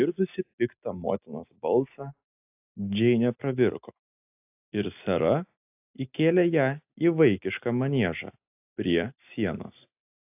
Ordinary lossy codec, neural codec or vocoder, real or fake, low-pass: AAC, 32 kbps; none; real; 3.6 kHz